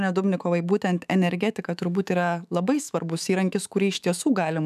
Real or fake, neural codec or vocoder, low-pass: fake; autoencoder, 48 kHz, 128 numbers a frame, DAC-VAE, trained on Japanese speech; 14.4 kHz